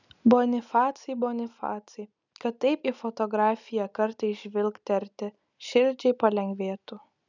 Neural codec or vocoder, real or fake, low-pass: none; real; 7.2 kHz